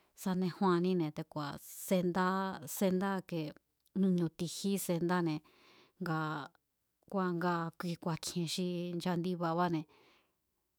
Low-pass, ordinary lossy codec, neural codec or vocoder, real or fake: none; none; autoencoder, 48 kHz, 128 numbers a frame, DAC-VAE, trained on Japanese speech; fake